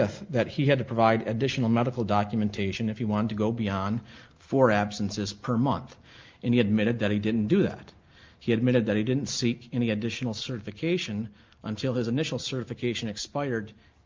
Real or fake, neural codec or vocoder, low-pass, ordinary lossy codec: real; none; 7.2 kHz; Opus, 24 kbps